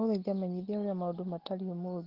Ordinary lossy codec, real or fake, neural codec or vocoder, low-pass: Opus, 16 kbps; real; none; 5.4 kHz